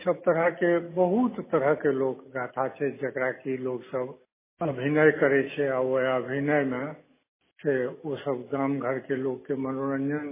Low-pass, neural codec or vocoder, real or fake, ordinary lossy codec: 3.6 kHz; none; real; MP3, 16 kbps